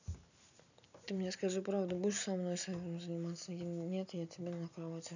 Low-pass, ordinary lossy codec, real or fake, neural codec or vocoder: 7.2 kHz; none; real; none